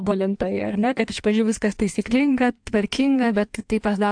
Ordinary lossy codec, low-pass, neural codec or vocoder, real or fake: AAC, 64 kbps; 9.9 kHz; codec, 16 kHz in and 24 kHz out, 1.1 kbps, FireRedTTS-2 codec; fake